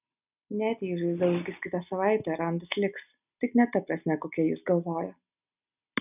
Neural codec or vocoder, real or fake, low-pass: none; real; 3.6 kHz